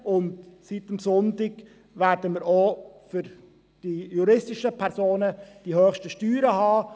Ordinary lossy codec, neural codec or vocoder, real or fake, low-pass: none; none; real; none